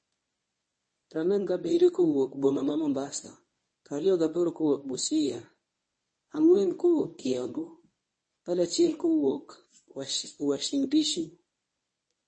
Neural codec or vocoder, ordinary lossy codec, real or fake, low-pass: codec, 24 kHz, 0.9 kbps, WavTokenizer, medium speech release version 1; MP3, 32 kbps; fake; 9.9 kHz